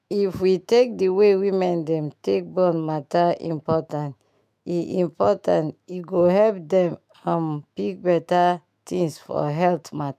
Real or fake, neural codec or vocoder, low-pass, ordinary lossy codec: fake; autoencoder, 48 kHz, 128 numbers a frame, DAC-VAE, trained on Japanese speech; 14.4 kHz; MP3, 96 kbps